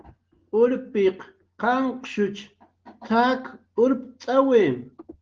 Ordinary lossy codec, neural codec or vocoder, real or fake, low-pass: Opus, 16 kbps; none; real; 7.2 kHz